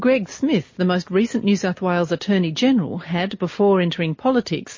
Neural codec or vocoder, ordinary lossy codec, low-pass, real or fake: none; MP3, 32 kbps; 7.2 kHz; real